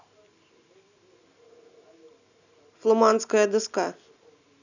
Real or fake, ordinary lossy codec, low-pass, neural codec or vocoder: real; none; 7.2 kHz; none